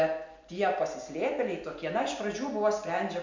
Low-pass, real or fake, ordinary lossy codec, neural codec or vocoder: 7.2 kHz; real; MP3, 64 kbps; none